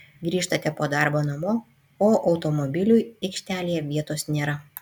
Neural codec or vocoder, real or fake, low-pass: none; real; 19.8 kHz